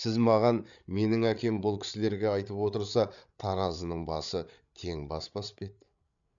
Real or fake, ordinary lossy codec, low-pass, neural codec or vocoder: fake; none; 7.2 kHz; codec, 16 kHz, 8 kbps, FreqCodec, larger model